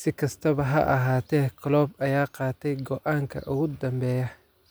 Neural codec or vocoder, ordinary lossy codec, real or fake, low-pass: none; none; real; none